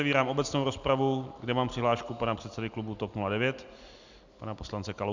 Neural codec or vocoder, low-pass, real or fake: none; 7.2 kHz; real